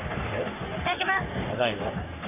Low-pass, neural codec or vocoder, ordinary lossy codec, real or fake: 3.6 kHz; codec, 44.1 kHz, 3.4 kbps, Pupu-Codec; none; fake